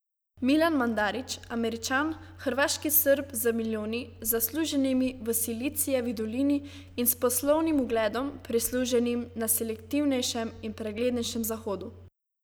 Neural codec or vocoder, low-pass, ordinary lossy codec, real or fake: none; none; none; real